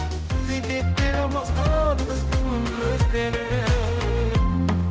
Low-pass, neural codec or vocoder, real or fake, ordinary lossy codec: none; codec, 16 kHz, 0.5 kbps, X-Codec, HuBERT features, trained on general audio; fake; none